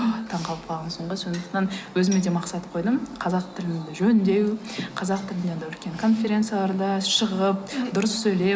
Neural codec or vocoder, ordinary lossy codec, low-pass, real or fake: none; none; none; real